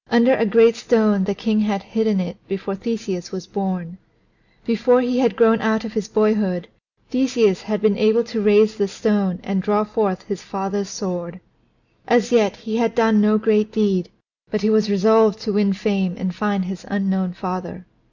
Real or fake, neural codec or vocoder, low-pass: real; none; 7.2 kHz